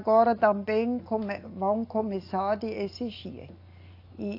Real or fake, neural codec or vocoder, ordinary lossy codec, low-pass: real; none; AAC, 32 kbps; 5.4 kHz